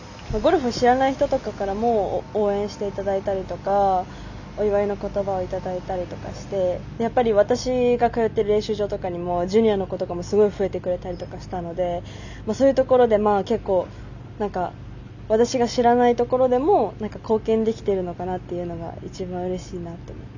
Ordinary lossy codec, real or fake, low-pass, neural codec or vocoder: none; real; 7.2 kHz; none